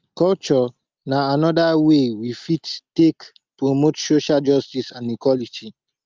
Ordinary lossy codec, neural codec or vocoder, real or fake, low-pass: Opus, 24 kbps; none; real; 7.2 kHz